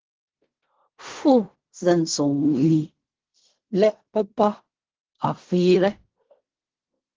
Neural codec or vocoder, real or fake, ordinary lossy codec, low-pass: codec, 16 kHz in and 24 kHz out, 0.4 kbps, LongCat-Audio-Codec, fine tuned four codebook decoder; fake; Opus, 16 kbps; 7.2 kHz